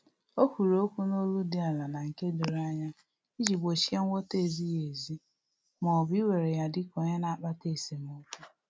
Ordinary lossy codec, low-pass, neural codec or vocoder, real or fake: none; none; none; real